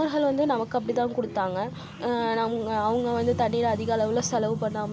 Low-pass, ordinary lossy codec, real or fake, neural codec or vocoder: none; none; real; none